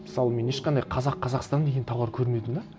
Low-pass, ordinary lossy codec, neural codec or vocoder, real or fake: none; none; none; real